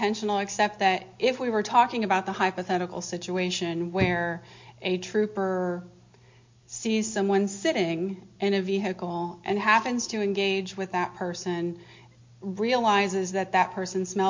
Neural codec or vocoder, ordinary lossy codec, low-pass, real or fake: none; MP3, 48 kbps; 7.2 kHz; real